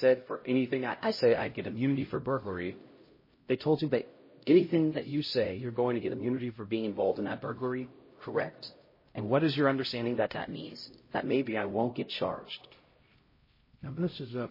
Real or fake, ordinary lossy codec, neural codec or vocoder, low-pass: fake; MP3, 24 kbps; codec, 16 kHz, 0.5 kbps, X-Codec, HuBERT features, trained on LibriSpeech; 5.4 kHz